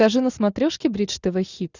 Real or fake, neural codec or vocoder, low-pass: real; none; 7.2 kHz